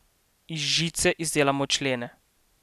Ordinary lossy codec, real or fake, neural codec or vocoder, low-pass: none; real; none; 14.4 kHz